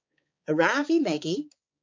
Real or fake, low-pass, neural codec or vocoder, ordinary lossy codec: fake; 7.2 kHz; codec, 16 kHz, 4 kbps, X-Codec, HuBERT features, trained on balanced general audio; MP3, 48 kbps